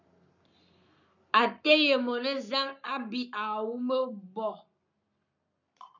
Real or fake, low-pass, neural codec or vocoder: fake; 7.2 kHz; codec, 44.1 kHz, 7.8 kbps, Pupu-Codec